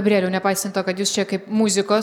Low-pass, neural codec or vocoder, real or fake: 19.8 kHz; vocoder, 44.1 kHz, 128 mel bands every 256 samples, BigVGAN v2; fake